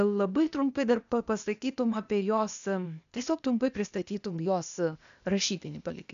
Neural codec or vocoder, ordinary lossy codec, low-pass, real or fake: codec, 16 kHz, 0.8 kbps, ZipCodec; MP3, 96 kbps; 7.2 kHz; fake